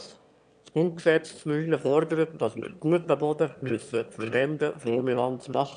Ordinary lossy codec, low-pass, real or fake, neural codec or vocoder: none; 9.9 kHz; fake; autoencoder, 22.05 kHz, a latent of 192 numbers a frame, VITS, trained on one speaker